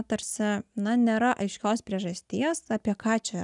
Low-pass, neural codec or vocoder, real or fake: 10.8 kHz; none; real